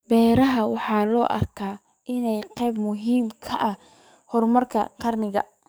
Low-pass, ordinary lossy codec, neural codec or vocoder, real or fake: none; none; codec, 44.1 kHz, 7.8 kbps, DAC; fake